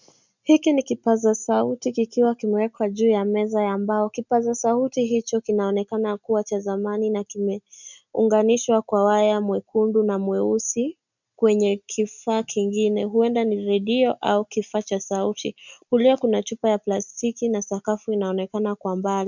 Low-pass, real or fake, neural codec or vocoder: 7.2 kHz; real; none